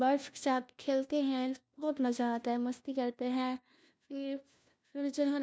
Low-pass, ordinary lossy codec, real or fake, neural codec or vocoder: none; none; fake; codec, 16 kHz, 1 kbps, FunCodec, trained on LibriTTS, 50 frames a second